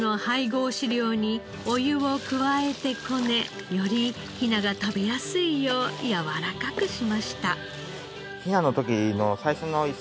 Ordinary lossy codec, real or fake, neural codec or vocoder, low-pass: none; real; none; none